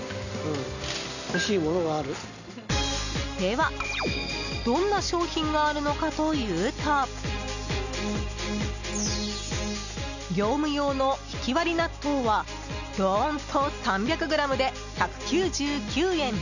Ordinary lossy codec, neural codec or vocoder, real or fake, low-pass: none; none; real; 7.2 kHz